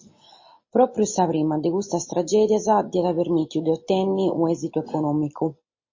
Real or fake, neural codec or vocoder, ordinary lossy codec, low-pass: real; none; MP3, 32 kbps; 7.2 kHz